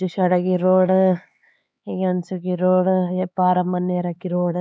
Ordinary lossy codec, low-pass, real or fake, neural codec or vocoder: none; none; fake; codec, 16 kHz, 4 kbps, X-Codec, HuBERT features, trained on LibriSpeech